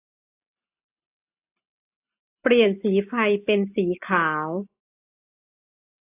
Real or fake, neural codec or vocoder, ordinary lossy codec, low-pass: real; none; AAC, 24 kbps; 3.6 kHz